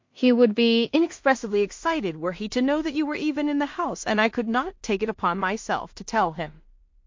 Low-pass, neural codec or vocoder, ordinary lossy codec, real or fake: 7.2 kHz; codec, 16 kHz in and 24 kHz out, 0.4 kbps, LongCat-Audio-Codec, two codebook decoder; MP3, 48 kbps; fake